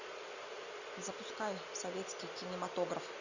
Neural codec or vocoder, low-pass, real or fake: none; 7.2 kHz; real